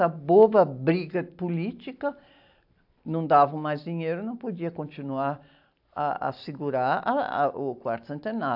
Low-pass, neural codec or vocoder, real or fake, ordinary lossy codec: 5.4 kHz; none; real; AAC, 48 kbps